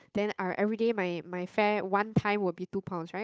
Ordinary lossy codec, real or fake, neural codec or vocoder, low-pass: none; fake; codec, 16 kHz, 8 kbps, FunCodec, trained on Chinese and English, 25 frames a second; none